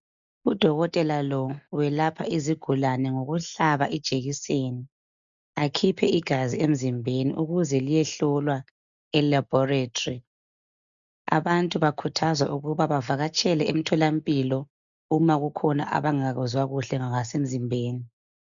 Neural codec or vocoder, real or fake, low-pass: none; real; 7.2 kHz